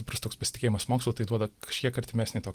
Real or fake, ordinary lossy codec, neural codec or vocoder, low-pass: real; Opus, 24 kbps; none; 14.4 kHz